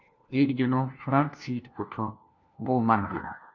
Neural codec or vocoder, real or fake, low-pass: codec, 16 kHz, 1 kbps, FunCodec, trained on LibriTTS, 50 frames a second; fake; 7.2 kHz